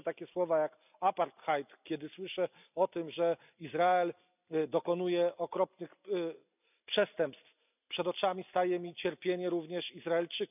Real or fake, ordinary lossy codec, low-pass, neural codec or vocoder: real; none; 3.6 kHz; none